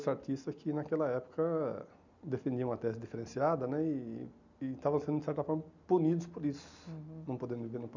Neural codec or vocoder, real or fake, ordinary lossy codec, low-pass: none; real; none; 7.2 kHz